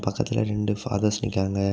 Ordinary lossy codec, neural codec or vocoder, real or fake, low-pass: none; none; real; none